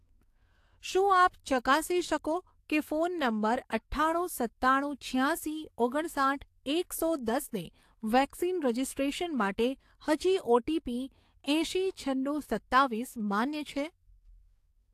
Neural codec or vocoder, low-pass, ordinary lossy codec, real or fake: codec, 44.1 kHz, 7.8 kbps, DAC; 14.4 kHz; AAC, 64 kbps; fake